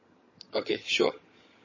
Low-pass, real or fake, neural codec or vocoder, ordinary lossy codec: 7.2 kHz; fake; vocoder, 22.05 kHz, 80 mel bands, HiFi-GAN; MP3, 32 kbps